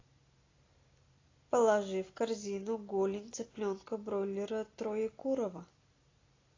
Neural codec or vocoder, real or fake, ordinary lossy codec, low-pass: none; real; AAC, 32 kbps; 7.2 kHz